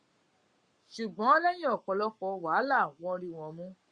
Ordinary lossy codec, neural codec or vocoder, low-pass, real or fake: Opus, 64 kbps; codec, 44.1 kHz, 7.8 kbps, Pupu-Codec; 9.9 kHz; fake